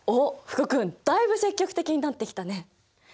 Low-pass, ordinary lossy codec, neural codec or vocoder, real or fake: none; none; none; real